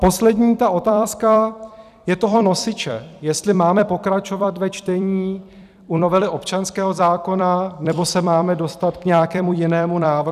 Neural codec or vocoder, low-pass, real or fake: vocoder, 44.1 kHz, 128 mel bands every 256 samples, BigVGAN v2; 14.4 kHz; fake